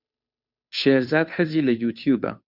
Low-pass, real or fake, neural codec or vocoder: 5.4 kHz; fake; codec, 16 kHz, 2 kbps, FunCodec, trained on Chinese and English, 25 frames a second